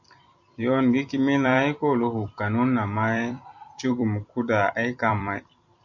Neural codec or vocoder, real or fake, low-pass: vocoder, 44.1 kHz, 128 mel bands every 256 samples, BigVGAN v2; fake; 7.2 kHz